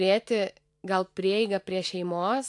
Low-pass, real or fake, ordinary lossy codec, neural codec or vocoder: 10.8 kHz; fake; AAC, 64 kbps; vocoder, 44.1 kHz, 128 mel bands every 512 samples, BigVGAN v2